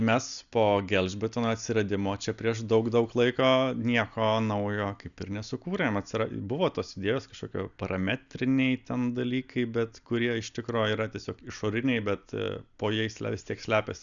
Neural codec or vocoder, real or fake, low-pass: none; real; 7.2 kHz